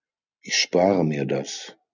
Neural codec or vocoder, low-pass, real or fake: none; 7.2 kHz; real